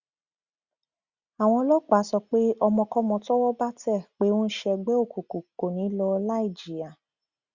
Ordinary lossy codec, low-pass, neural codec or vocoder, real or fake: Opus, 64 kbps; 7.2 kHz; none; real